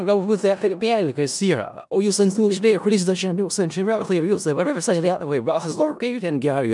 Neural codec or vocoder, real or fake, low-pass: codec, 16 kHz in and 24 kHz out, 0.4 kbps, LongCat-Audio-Codec, four codebook decoder; fake; 10.8 kHz